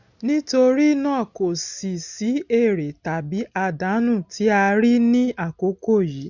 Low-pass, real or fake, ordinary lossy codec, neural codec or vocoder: 7.2 kHz; real; none; none